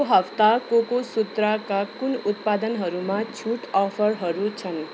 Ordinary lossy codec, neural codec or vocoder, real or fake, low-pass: none; none; real; none